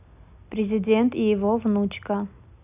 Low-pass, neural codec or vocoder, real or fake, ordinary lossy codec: 3.6 kHz; none; real; none